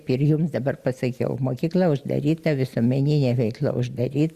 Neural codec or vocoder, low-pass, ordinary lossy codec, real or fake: none; 14.4 kHz; Opus, 64 kbps; real